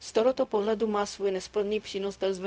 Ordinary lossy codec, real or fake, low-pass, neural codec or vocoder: none; fake; none; codec, 16 kHz, 0.4 kbps, LongCat-Audio-Codec